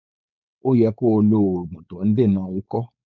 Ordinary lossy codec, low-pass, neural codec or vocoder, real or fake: none; 7.2 kHz; codec, 16 kHz, 4.8 kbps, FACodec; fake